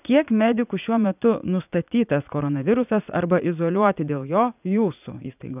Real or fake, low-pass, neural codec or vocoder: fake; 3.6 kHz; vocoder, 44.1 kHz, 80 mel bands, Vocos